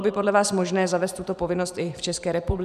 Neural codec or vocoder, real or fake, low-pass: vocoder, 44.1 kHz, 128 mel bands every 512 samples, BigVGAN v2; fake; 14.4 kHz